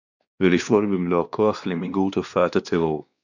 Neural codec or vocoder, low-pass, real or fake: codec, 16 kHz, 2 kbps, X-Codec, WavLM features, trained on Multilingual LibriSpeech; 7.2 kHz; fake